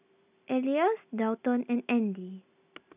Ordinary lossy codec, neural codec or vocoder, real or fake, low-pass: none; none; real; 3.6 kHz